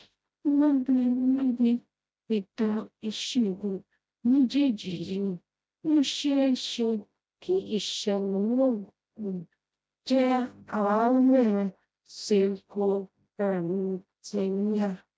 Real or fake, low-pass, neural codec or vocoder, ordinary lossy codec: fake; none; codec, 16 kHz, 0.5 kbps, FreqCodec, smaller model; none